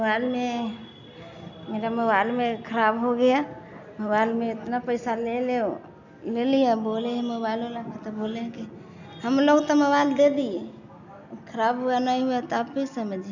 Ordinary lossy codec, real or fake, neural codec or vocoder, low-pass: none; real; none; 7.2 kHz